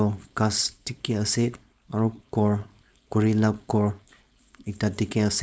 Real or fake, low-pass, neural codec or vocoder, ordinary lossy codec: fake; none; codec, 16 kHz, 4.8 kbps, FACodec; none